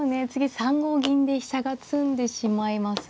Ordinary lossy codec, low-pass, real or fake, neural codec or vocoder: none; none; real; none